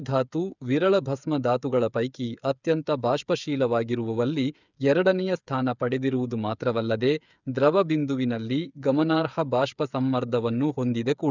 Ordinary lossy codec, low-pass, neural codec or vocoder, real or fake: none; 7.2 kHz; codec, 16 kHz, 8 kbps, FreqCodec, smaller model; fake